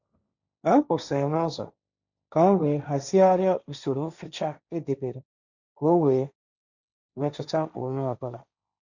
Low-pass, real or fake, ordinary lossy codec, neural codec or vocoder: 7.2 kHz; fake; none; codec, 16 kHz, 1.1 kbps, Voila-Tokenizer